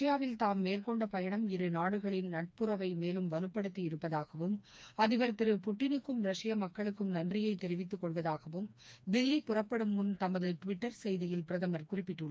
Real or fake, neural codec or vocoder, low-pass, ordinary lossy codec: fake; codec, 16 kHz, 2 kbps, FreqCodec, smaller model; none; none